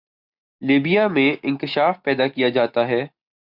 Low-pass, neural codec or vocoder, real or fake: 5.4 kHz; none; real